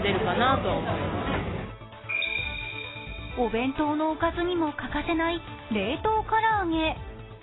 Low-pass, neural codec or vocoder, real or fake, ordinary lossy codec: 7.2 kHz; none; real; AAC, 16 kbps